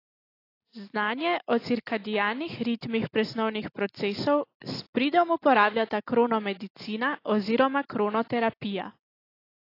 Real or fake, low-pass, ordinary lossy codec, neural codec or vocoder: real; 5.4 kHz; AAC, 32 kbps; none